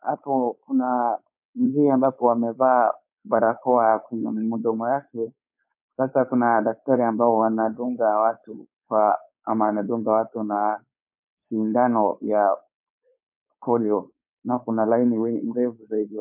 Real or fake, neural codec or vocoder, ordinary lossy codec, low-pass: fake; codec, 16 kHz, 4.8 kbps, FACodec; MP3, 32 kbps; 3.6 kHz